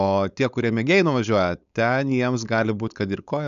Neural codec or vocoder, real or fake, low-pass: codec, 16 kHz, 4.8 kbps, FACodec; fake; 7.2 kHz